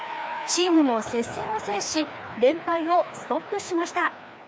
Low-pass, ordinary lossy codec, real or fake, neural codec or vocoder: none; none; fake; codec, 16 kHz, 2 kbps, FreqCodec, larger model